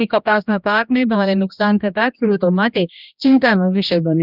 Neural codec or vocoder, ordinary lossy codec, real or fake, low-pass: codec, 16 kHz, 1 kbps, X-Codec, HuBERT features, trained on general audio; none; fake; 5.4 kHz